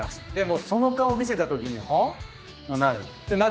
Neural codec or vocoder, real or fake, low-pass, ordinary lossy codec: codec, 16 kHz, 2 kbps, X-Codec, HuBERT features, trained on general audio; fake; none; none